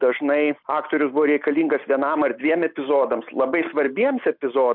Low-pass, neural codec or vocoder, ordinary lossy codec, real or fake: 5.4 kHz; none; MP3, 48 kbps; real